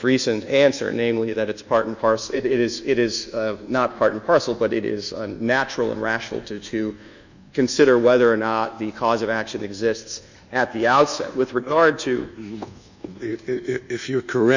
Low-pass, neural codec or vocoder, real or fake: 7.2 kHz; codec, 24 kHz, 1.2 kbps, DualCodec; fake